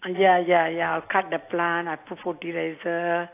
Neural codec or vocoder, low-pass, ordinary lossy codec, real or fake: none; 3.6 kHz; AAC, 24 kbps; real